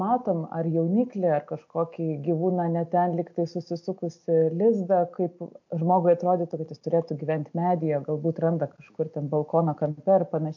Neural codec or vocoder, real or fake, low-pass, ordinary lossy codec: none; real; 7.2 kHz; MP3, 48 kbps